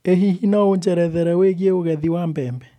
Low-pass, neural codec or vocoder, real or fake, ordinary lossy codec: 19.8 kHz; none; real; none